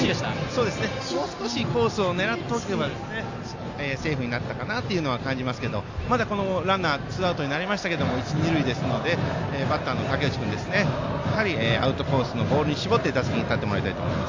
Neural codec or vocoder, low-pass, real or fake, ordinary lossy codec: none; 7.2 kHz; real; AAC, 48 kbps